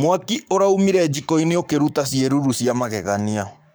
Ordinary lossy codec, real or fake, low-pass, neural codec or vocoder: none; fake; none; codec, 44.1 kHz, 7.8 kbps, Pupu-Codec